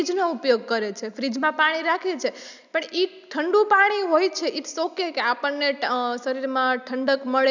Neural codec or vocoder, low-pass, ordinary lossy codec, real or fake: none; 7.2 kHz; none; real